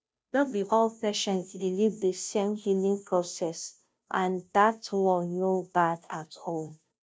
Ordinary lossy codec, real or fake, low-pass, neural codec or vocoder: none; fake; none; codec, 16 kHz, 0.5 kbps, FunCodec, trained on Chinese and English, 25 frames a second